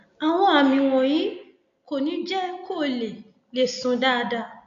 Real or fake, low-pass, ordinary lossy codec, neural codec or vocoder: real; 7.2 kHz; none; none